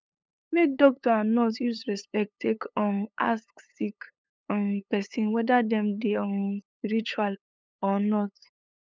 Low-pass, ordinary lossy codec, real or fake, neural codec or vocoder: none; none; fake; codec, 16 kHz, 8 kbps, FunCodec, trained on LibriTTS, 25 frames a second